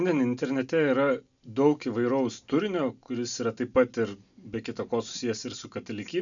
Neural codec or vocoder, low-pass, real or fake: none; 7.2 kHz; real